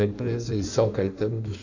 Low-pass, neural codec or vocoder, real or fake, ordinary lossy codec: 7.2 kHz; codec, 16 kHz in and 24 kHz out, 1.1 kbps, FireRedTTS-2 codec; fake; none